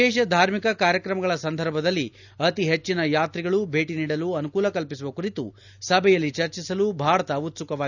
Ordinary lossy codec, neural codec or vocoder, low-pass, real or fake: none; none; 7.2 kHz; real